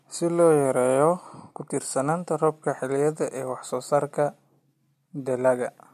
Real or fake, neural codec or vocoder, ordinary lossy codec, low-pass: real; none; MP3, 64 kbps; 14.4 kHz